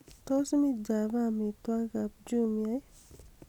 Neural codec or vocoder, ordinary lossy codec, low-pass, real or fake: none; none; 19.8 kHz; real